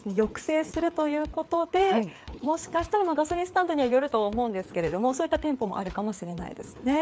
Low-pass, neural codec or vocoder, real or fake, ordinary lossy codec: none; codec, 16 kHz, 4 kbps, FreqCodec, larger model; fake; none